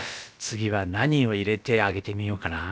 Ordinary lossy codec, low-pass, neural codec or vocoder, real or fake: none; none; codec, 16 kHz, about 1 kbps, DyCAST, with the encoder's durations; fake